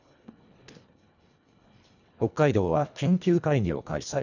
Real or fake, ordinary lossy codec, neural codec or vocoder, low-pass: fake; none; codec, 24 kHz, 1.5 kbps, HILCodec; 7.2 kHz